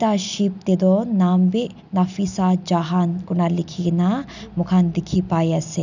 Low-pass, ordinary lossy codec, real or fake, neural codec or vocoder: 7.2 kHz; none; real; none